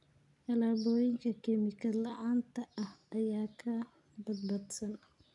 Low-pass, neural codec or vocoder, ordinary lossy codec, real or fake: 10.8 kHz; none; none; real